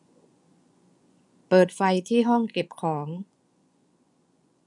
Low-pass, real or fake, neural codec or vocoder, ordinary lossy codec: 10.8 kHz; fake; vocoder, 24 kHz, 100 mel bands, Vocos; none